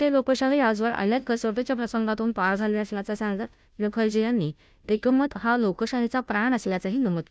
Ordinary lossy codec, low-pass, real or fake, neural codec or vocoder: none; none; fake; codec, 16 kHz, 0.5 kbps, FunCodec, trained on Chinese and English, 25 frames a second